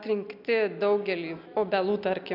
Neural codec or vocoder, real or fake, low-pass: none; real; 5.4 kHz